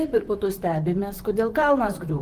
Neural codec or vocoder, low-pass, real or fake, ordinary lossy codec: vocoder, 44.1 kHz, 128 mel bands, Pupu-Vocoder; 14.4 kHz; fake; Opus, 24 kbps